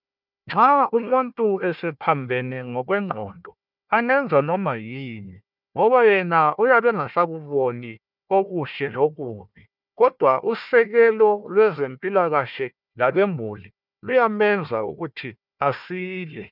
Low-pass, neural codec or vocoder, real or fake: 5.4 kHz; codec, 16 kHz, 1 kbps, FunCodec, trained on Chinese and English, 50 frames a second; fake